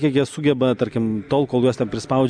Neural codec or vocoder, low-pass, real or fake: none; 9.9 kHz; real